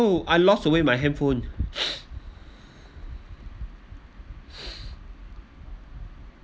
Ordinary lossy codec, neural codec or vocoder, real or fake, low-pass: none; none; real; none